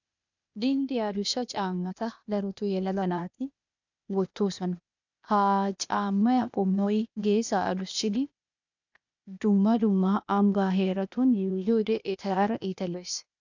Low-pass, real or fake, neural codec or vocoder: 7.2 kHz; fake; codec, 16 kHz, 0.8 kbps, ZipCodec